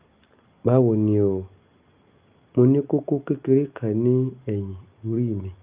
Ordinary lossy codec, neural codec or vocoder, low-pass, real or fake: Opus, 24 kbps; none; 3.6 kHz; real